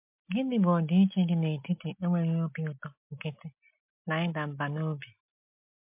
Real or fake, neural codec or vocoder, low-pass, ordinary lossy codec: real; none; 3.6 kHz; MP3, 32 kbps